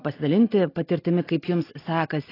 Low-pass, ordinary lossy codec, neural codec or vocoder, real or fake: 5.4 kHz; AAC, 24 kbps; none; real